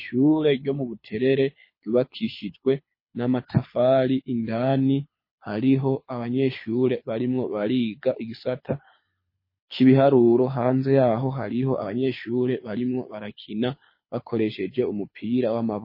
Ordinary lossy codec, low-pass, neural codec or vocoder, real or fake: MP3, 24 kbps; 5.4 kHz; codec, 24 kHz, 6 kbps, HILCodec; fake